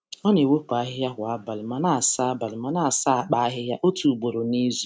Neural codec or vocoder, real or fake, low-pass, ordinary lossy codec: none; real; none; none